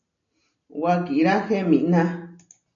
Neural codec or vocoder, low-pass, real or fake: none; 7.2 kHz; real